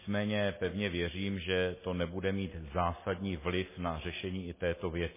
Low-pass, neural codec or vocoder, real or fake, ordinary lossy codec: 3.6 kHz; none; real; MP3, 16 kbps